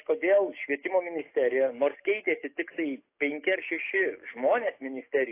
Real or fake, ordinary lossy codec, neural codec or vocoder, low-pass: fake; AAC, 24 kbps; codec, 44.1 kHz, 7.8 kbps, DAC; 3.6 kHz